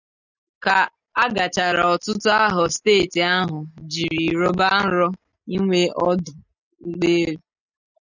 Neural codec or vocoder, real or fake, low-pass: none; real; 7.2 kHz